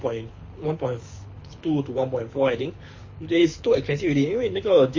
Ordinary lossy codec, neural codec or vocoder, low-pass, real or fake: MP3, 32 kbps; codec, 24 kHz, 6 kbps, HILCodec; 7.2 kHz; fake